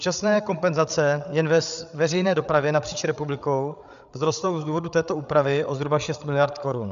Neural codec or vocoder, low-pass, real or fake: codec, 16 kHz, 8 kbps, FreqCodec, larger model; 7.2 kHz; fake